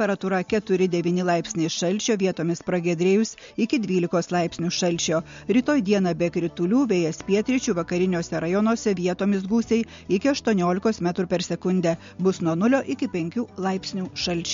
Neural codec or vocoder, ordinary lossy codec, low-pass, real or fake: none; MP3, 48 kbps; 7.2 kHz; real